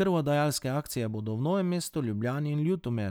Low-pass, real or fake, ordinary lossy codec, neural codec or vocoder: none; real; none; none